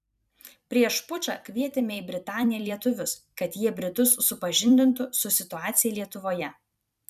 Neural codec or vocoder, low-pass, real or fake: vocoder, 44.1 kHz, 128 mel bands every 256 samples, BigVGAN v2; 14.4 kHz; fake